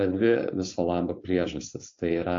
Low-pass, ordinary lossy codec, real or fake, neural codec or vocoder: 7.2 kHz; AAC, 48 kbps; fake; codec, 16 kHz, 4.8 kbps, FACodec